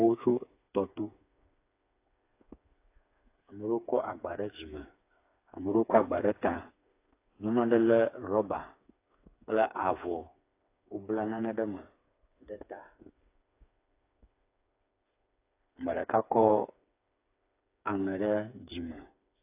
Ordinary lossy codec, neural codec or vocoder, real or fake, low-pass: AAC, 24 kbps; codec, 16 kHz, 4 kbps, FreqCodec, smaller model; fake; 3.6 kHz